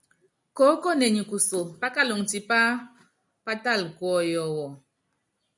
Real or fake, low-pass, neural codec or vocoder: real; 10.8 kHz; none